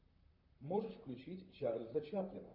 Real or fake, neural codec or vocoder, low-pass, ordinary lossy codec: fake; vocoder, 44.1 kHz, 128 mel bands, Pupu-Vocoder; 5.4 kHz; AAC, 48 kbps